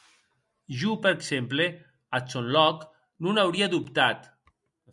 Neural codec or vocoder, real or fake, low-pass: none; real; 10.8 kHz